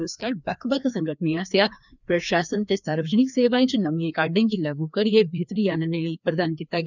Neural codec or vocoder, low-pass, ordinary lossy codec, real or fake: codec, 16 kHz, 2 kbps, FreqCodec, larger model; 7.2 kHz; none; fake